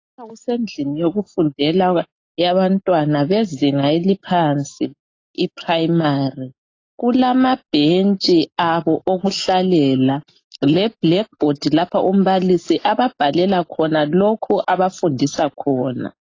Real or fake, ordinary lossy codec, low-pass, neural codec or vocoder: real; AAC, 32 kbps; 7.2 kHz; none